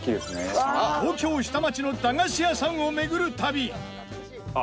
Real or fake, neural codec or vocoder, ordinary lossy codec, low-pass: real; none; none; none